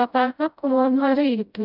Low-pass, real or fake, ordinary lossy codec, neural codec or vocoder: 5.4 kHz; fake; none; codec, 16 kHz, 0.5 kbps, FreqCodec, smaller model